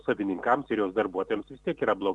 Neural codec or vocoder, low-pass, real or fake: vocoder, 44.1 kHz, 128 mel bands every 256 samples, BigVGAN v2; 10.8 kHz; fake